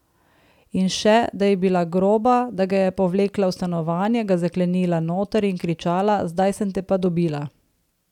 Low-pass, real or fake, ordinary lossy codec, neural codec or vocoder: 19.8 kHz; real; none; none